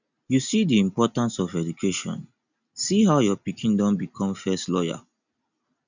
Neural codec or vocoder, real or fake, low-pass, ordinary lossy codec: none; real; 7.2 kHz; none